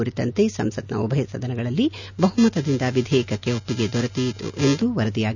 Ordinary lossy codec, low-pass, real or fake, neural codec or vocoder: none; 7.2 kHz; real; none